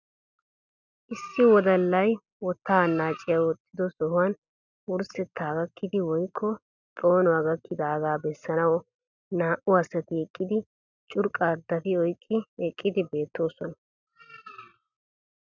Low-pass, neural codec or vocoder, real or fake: 7.2 kHz; none; real